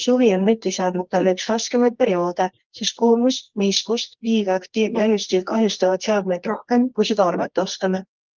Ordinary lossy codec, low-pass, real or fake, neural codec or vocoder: Opus, 24 kbps; 7.2 kHz; fake; codec, 24 kHz, 0.9 kbps, WavTokenizer, medium music audio release